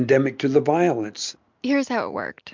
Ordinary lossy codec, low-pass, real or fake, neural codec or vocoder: MP3, 64 kbps; 7.2 kHz; real; none